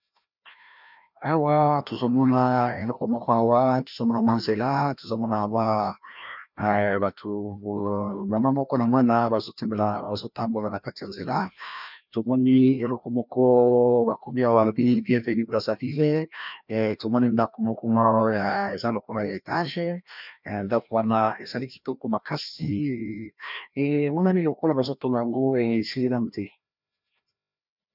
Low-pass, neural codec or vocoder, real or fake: 5.4 kHz; codec, 16 kHz, 1 kbps, FreqCodec, larger model; fake